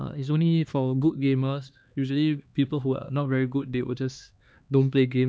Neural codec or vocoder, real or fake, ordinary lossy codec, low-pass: codec, 16 kHz, 2 kbps, X-Codec, HuBERT features, trained on LibriSpeech; fake; none; none